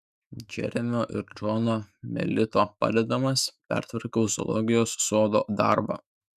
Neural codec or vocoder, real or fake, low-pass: autoencoder, 48 kHz, 128 numbers a frame, DAC-VAE, trained on Japanese speech; fake; 14.4 kHz